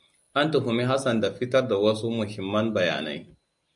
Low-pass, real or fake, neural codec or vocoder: 10.8 kHz; real; none